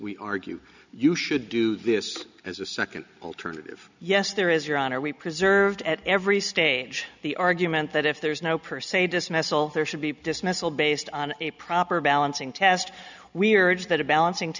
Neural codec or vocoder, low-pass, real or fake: none; 7.2 kHz; real